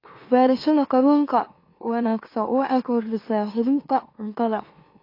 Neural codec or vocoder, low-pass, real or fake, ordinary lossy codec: autoencoder, 44.1 kHz, a latent of 192 numbers a frame, MeloTTS; 5.4 kHz; fake; AAC, 32 kbps